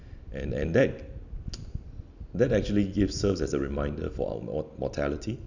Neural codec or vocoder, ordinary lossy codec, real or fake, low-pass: none; none; real; 7.2 kHz